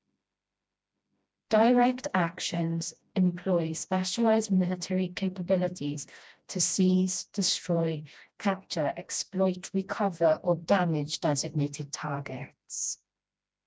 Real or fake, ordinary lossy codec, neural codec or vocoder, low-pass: fake; none; codec, 16 kHz, 1 kbps, FreqCodec, smaller model; none